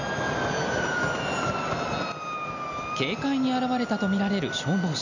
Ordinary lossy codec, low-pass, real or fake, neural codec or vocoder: none; 7.2 kHz; real; none